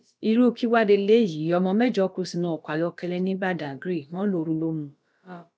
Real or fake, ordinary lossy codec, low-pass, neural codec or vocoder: fake; none; none; codec, 16 kHz, about 1 kbps, DyCAST, with the encoder's durations